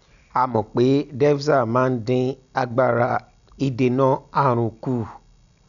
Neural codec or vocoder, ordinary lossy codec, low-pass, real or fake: none; none; 7.2 kHz; real